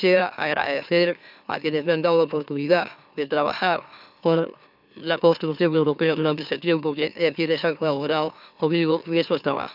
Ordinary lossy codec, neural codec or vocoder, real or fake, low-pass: none; autoencoder, 44.1 kHz, a latent of 192 numbers a frame, MeloTTS; fake; 5.4 kHz